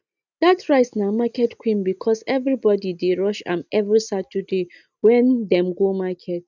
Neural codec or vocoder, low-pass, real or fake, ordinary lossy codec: none; 7.2 kHz; real; none